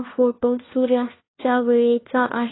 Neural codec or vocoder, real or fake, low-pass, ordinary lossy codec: codec, 16 kHz, 1 kbps, FunCodec, trained on LibriTTS, 50 frames a second; fake; 7.2 kHz; AAC, 16 kbps